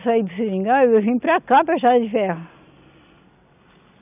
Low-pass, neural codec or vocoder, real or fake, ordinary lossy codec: 3.6 kHz; none; real; none